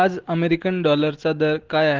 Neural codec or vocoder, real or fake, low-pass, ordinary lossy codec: none; real; 7.2 kHz; Opus, 16 kbps